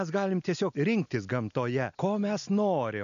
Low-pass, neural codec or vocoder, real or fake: 7.2 kHz; none; real